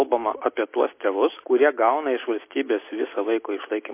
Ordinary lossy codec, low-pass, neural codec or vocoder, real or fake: MP3, 24 kbps; 3.6 kHz; none; real